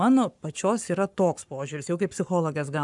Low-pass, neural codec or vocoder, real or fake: 10.8 kHz; codec, 44.1 kHz, 7.8 kbps, Pupu-Codec; fake